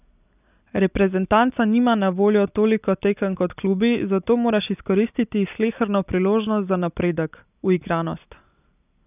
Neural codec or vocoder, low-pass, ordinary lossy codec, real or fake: none; 3.6 kHz; none; real